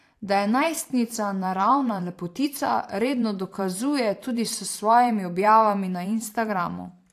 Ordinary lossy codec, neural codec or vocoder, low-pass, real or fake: AAC, 64 kbps; vocoder, 44.1 kHz, 128 mel bands every 512 samples, BigVGAN v2; 14.4 kHz; fake